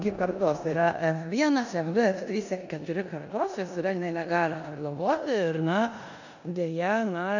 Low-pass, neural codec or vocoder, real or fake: 7.2 kHz; codec, 16 kHz in and 24 kHz out, 0.9 kbps, LongCat-Audio-Codec, four codebook decoder; fake